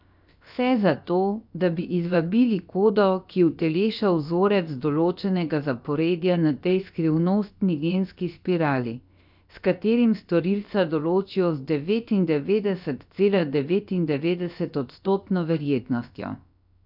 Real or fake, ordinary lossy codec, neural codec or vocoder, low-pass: fake; none; codec, 16 kHz, 0.7 kbps, FocalCodec; 5.4 kHz